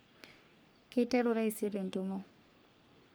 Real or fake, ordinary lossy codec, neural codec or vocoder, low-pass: fake; none; codec, 44.1 kHz, 3.4 kbps, Pupu-Codec; none